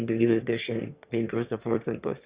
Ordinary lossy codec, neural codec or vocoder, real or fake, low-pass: Opus, 64 kbps; autoencoder, 22.05 kHz, a latent of 192 numbers a frame, VITS, trained on one speaker; fake; 3.6 kHz